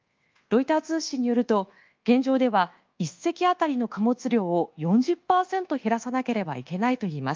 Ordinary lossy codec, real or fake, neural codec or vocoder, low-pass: Opus, 24 kbps; fake; codec, 24 kHz, 1.2 kbps, DualCodec; 7.2 kHz